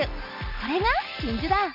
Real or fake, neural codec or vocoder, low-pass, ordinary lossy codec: real; none; 5.4 kHz; none